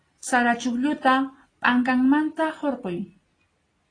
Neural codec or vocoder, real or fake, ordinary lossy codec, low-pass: none; real; AAC, 32 kbps; 9.9 kHz